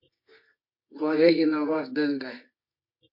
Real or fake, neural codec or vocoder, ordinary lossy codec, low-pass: fake; codec, 24 kHz, 0.9 kbps, WavTokenizer, medium music audio release; MP3, 32 kbps; 5.4 kHz